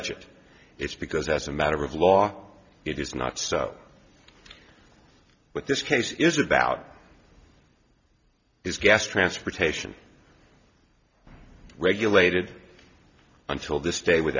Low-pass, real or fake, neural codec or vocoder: 7.2 kHz; real; none